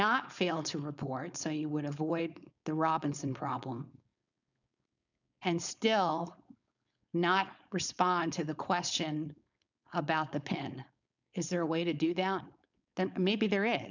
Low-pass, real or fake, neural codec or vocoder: 7.2 kHz; fake; codec, 16 kHz, 4.8 kbps, FACodec